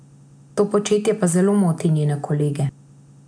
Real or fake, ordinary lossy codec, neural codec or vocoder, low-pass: real; MP3, 96 kbps; none; 9.9 kHz